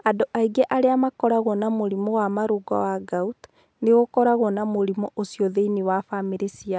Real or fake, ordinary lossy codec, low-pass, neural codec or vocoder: real; none; none; none